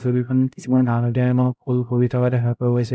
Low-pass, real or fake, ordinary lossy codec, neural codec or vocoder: none; fake; none; codec, 16 kHz, 0.5 kbps, X-Codec, HuBERT features, trained on LibriSpeech